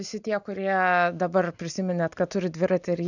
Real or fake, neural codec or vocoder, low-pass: real; none; 7.2 kHz